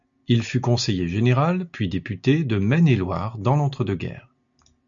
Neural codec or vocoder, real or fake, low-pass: none; real; 7.2 kHz